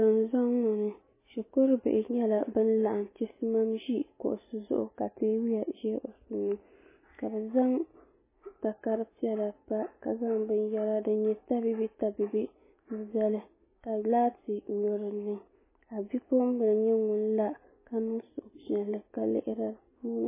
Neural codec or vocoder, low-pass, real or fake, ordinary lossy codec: none; 3.6 kHz; real; MP3, 16 kbps